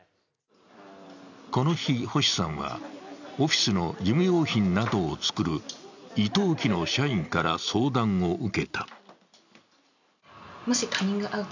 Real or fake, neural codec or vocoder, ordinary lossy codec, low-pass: real; none; none; 7.2 kHz